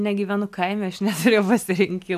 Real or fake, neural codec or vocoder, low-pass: real; none; 14.4 kHz